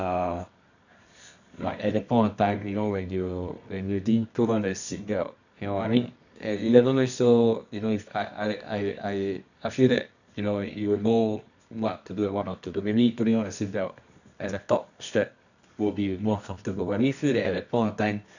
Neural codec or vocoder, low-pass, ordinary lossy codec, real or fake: codec, 24 kHz, 0.9 kbps, WavTokenizer, medium music audio release; 7.2 kHz; none; fake